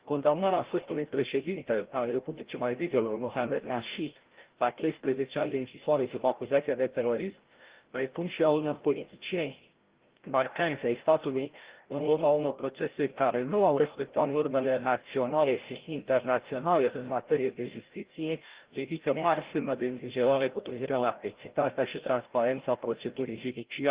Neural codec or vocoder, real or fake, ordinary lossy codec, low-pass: codec, 16 kHz, 0.5 kbps, FreqCodec, larger model; fake; Opus, 16 kbps; 3.6 kHz